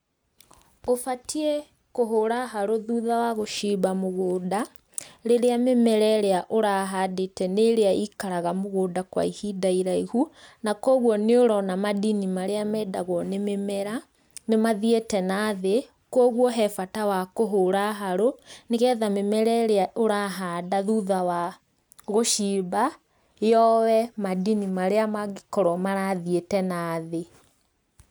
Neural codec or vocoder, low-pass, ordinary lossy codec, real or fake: none; none; none; real